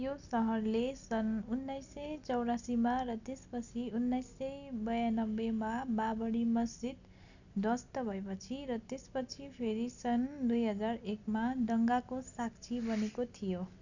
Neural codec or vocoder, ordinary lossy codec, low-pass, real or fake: none; none; 7.2 kHz; real